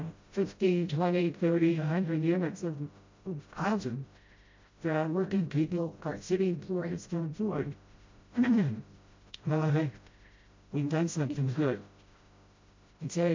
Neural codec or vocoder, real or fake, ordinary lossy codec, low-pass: codec, 16 kHz, 0.5 kbps, FreqCodec, smaller model; fake; MP3, 48 kbps; 7.2 kHz